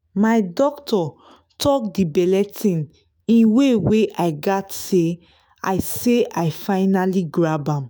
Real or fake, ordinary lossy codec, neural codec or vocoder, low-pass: fake; none; autoencoder, 48 kHz, 128 numbers a frame, DAC-VAE, trained on Japanese speech; none